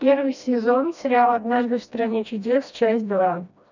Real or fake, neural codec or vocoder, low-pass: fake; codec, 16 kHz, 1 kbps, FreqCodec, smaller model; 7.2 kHz